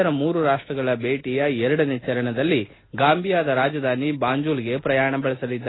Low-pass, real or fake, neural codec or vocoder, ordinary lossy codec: 7.2 kHz; real; none; AAC, 16 kbps